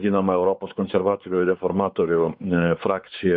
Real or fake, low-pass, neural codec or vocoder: fake; 5.4 kHz; codec, 16 kHz, 4 kbps, X-Codec, WavLM features, trained on Multilingual LibriSpeech